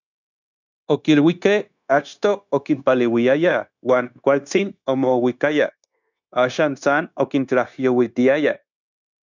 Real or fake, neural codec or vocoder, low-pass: fake; codec, 16 kHz, 0.9 kbps, LongCat-Audio-Codec; 7.2 kHz